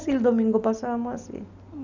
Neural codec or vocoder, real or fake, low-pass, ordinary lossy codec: none; real; 7.2 kHz; none